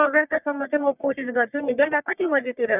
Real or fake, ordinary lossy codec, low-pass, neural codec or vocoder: fake; none; 3.6 kHz; codec, 44.1 kHz, 1.7 kbps, Pupu-Codec